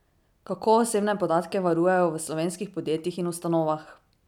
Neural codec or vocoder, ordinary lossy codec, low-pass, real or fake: none; none; 19.8 kHz; real